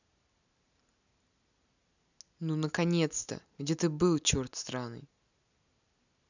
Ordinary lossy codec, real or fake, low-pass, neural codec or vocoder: none; real; 7.2 kHz; none